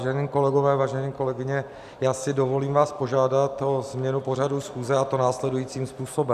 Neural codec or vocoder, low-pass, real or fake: none; 14.4 kHz; real